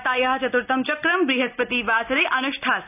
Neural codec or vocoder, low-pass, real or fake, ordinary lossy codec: none; 3.6 kHz; real; none